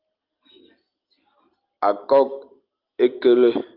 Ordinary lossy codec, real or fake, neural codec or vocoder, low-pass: Opus, 32 kbps; real; none; 5.4 kHz